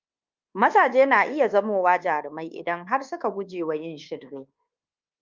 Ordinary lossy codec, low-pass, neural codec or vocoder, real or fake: Opus, 32 kbps; 7.2 kHz; codec, 24 kHz, 1.2 kbps, DualCodec; fake